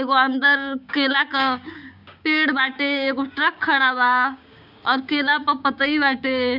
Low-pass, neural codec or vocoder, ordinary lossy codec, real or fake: 5.4 kHz; codec, 16 kHz, 6 kbps, DAC; none; fake